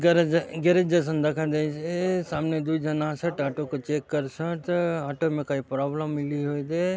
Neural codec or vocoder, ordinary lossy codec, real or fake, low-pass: none; none; real; none